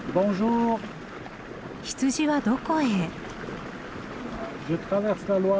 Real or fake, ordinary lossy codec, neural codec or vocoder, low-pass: real; none; none; none